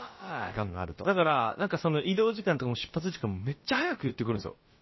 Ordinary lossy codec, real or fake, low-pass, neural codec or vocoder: MP3, 24 kbps; fake; 7.2 kHz; codec, 16 kHz, about 1 kbps, DyCAST, with the encoder's durations